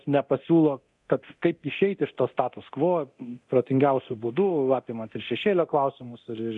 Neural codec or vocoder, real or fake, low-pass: codec, 24 kHz, 0.9 kbps, DualCodec; fake; 10.8 kHz